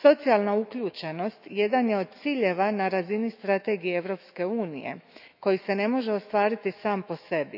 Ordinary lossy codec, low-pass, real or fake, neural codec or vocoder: none; 5.4 kHz; fake; autoencoder, 48 kHz, 128 numbers a frame, DAC-VAE, trained on Japanese speech